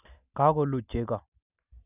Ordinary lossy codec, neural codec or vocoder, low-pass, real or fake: none; none; 3.6 kHz; real